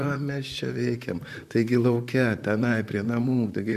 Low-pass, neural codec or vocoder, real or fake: 14.4 kHz; vocoder, 44.1 kHz, 128 mel bands, Pupu-Vocoder; fake